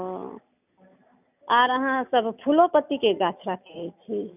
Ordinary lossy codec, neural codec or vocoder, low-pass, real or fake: none; none; 3.6 kHz; real